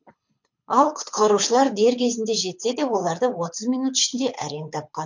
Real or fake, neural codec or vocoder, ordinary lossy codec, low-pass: fake; codec, 24 kHz, 6 kbps, HILCodec; MP3, 48 kbps; 7.2 kHz